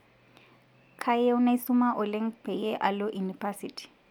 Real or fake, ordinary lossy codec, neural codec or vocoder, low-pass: real; none; none; 19.8 kHz